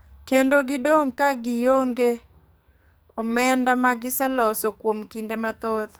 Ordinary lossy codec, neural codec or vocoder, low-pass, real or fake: none; codec, 44.1 kHz, 2.6 kbps, SNAC; none; fake